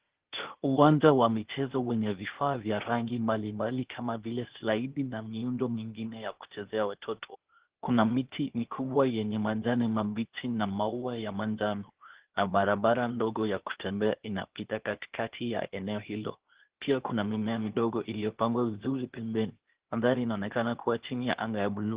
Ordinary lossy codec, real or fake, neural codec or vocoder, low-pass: Opus, 16 kbps; fake; codec, 16 kHz, 0.8 kbps, ZipCodec; 3.6 kHz